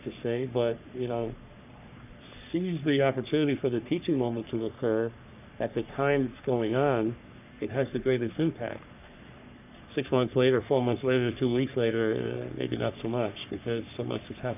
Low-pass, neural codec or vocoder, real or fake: 3.6 kHz; codec, 44.1 kHz, 3.4 kbps, Pupu-Codec; fake